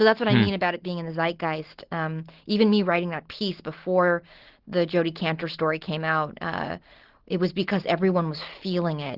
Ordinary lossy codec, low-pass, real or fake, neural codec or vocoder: Opus, 16 kbps; 5.4 kHz; real; none